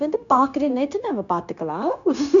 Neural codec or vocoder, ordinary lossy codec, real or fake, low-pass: codec, 16 kHz, 0.9 kbps, LongCat-Audio-Codec; none; fake; 7.2 kHz